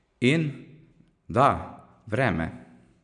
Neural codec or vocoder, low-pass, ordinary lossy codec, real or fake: none; 9.9 kHz; none; real